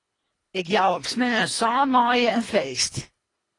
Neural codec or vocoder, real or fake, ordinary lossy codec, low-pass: codec, 24 kHz, 1.5 kbps, HILCodec; fake; AAC, 32 kbps; 10.8 kHz